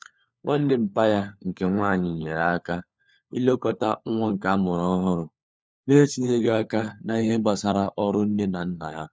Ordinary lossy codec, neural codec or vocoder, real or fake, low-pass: none; codec, 16 kHz, 4 kbps, FunCodec, trained on LibriTTS, 50 frames a second; fake; none